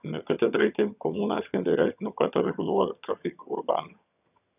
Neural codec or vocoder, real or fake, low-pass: vocoder, 22.05 kHz, 80 mel bands, HiFi-GAN; fake; 3.6 kHz